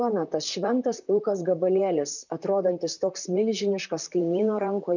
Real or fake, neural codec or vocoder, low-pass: fake; vocoder, 44.1 kHz, 128 mel bands, Pupu-Vocoder; 7.2 kHz